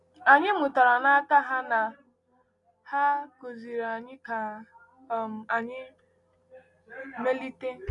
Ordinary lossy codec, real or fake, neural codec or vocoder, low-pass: none; real; none; 10.8 kHz